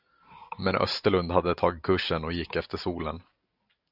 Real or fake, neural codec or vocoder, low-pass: real; none; 5.4 kHz